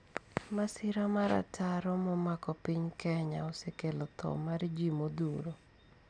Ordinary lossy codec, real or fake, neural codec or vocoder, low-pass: none; real; none; 9.9 kHz